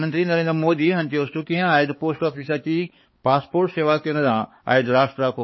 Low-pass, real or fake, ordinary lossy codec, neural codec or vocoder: 7.2 kHz; fake; MP3, 24 kbps; codec, 16 kHz, 4 kbps, X-Codec, HuBERT features, trained on balanced general audio